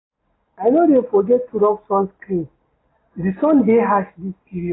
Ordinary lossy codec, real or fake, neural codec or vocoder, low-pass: AAC, 16 kbps; real; none; 7.2 kHz